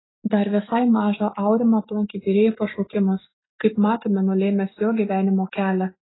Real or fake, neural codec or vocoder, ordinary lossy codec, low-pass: real; none; AAC, 16 kbps; 7.2 kHz